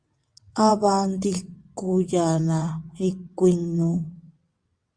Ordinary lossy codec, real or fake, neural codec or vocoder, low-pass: Opus, 64 kbps; fake; vocoder, 22.05 kHz, 80 mel bands, WaveNeXt; 9.9 kHz